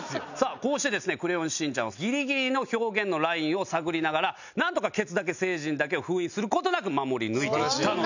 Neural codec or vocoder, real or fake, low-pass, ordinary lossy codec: none; real; 7.2 kHz; none